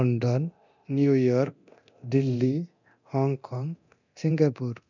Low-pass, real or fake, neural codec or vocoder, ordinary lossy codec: 7.2 kHz; fake; codec, 24 kHz, 0.9 kbps, DualCodec; none